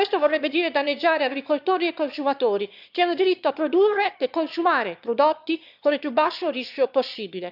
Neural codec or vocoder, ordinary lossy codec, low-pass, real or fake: autoencoder, 22.05 kHz, a latent of 192 numbers a frame, VITS, trained on one speaker; none; 5.4 kHz; fake